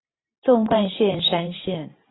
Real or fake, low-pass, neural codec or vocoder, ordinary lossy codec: fake; 7.2 kHz; vocoder, 44.1 kHz, 128 mel bands every 512 samples, BigVGAN v2; AAC, 16 kbps